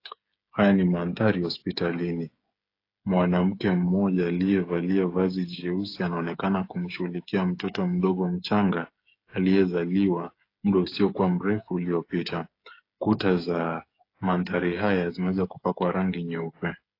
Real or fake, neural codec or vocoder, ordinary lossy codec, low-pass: fake; codec, 16 kHz, 8 kbps, FreqCodec, smaller model; AAC, 32 kbps; 5.4 kHz